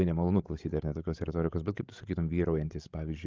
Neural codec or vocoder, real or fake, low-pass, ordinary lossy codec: codec, 16 kHz, 16 kbps, FreqCodec, larger model; fake; 7.2 kHz; Opus, 32 kbps